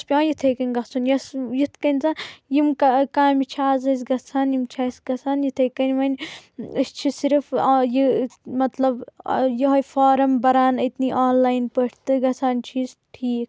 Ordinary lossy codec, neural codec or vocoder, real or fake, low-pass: none; none; real; none